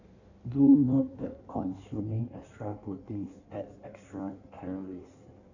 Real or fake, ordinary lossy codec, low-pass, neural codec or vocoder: fake; none; 7.2 kHz; codec, 16 kHz in and 24 kHz out, 1.1 kbps, FireRedTTS-2 codec